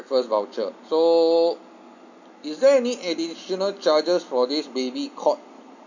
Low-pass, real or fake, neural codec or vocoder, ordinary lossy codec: 7.2 kHz; real; none; none